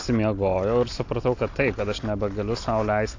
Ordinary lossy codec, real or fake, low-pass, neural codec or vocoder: AAC, 48 kbps; real; 7.2 kHz; none